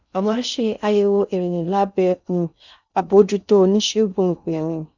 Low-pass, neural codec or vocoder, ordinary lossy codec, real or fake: 7.2 kHz; codec, 16 kHz in and 24 kHz out, 0.6 kbps, FocalCodec, streaming, 2048 codes; none; fake